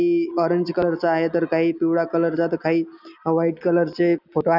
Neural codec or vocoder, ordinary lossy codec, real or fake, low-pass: none; none; real; 5.4 kHz